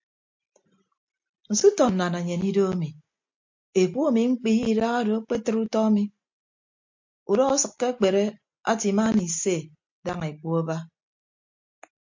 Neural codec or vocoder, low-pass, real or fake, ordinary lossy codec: none; 7.2 kHz; real; MP3, 48 kbps